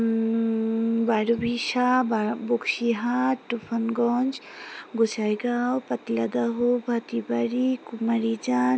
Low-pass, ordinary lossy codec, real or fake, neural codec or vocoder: none; none; real; none